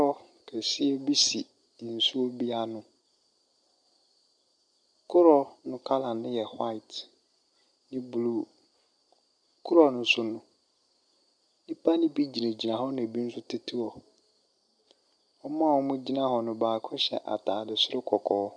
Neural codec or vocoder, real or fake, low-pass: none; real; 9.9 kHz